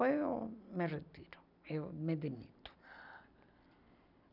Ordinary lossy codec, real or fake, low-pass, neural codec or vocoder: none; real; 5.4 kHz; none